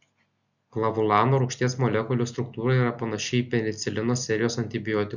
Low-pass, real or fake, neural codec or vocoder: 7.2 kHz; real; none